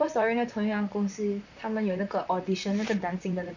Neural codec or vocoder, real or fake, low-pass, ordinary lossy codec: vocoder, 44.1 kHz, 128 mel bands, Pupu-Vocoder; fake; 7.2 kHz; none